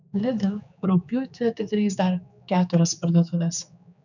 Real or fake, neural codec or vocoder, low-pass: fake; codec, 16 kHz, 4 kbps, X-Codec, HuBERT features, trained on general audio; 7.2 kHz